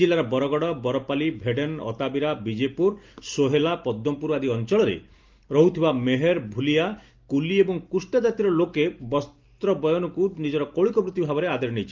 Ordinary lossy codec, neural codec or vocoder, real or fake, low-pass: Opus, 32 kbps; none; real; 7.2 kHz